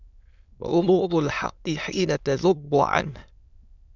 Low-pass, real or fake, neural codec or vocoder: 7.2 kHz; fake; autoencoder, 22.05 kHz, a latent of 192 numbers a frame, VITS, trained on many speakers